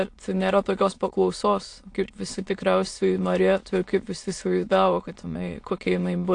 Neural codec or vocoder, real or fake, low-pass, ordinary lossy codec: autoencoder, 22.05 kHz, a latent of 192 numbers a frame, VITS, trained on many speakers; fake; 9.9 kHz; AAC, 48 kbps